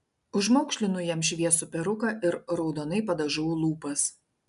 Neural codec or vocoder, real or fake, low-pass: none; real; 10.8 kHz